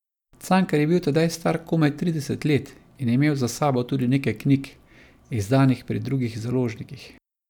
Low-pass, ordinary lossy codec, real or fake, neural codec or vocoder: 19.8 kHz; none; real; none